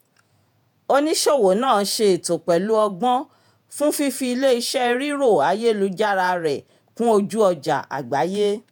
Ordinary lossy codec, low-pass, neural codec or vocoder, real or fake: none; none; vocoder, 48 kHz, 128 mel bands, Vocos; fake